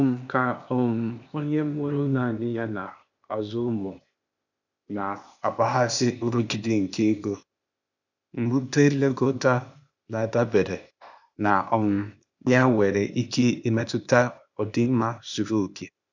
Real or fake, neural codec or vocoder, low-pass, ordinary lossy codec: fake; codec, 16 kHz, 0.8 kbps, ZipCodec; 7.2 kHz; none